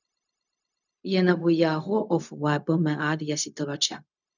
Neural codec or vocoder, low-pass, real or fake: codec, 16 kHz, 0.4 kbps, LongCat-Audio-Codec; 7.2 kHz; fake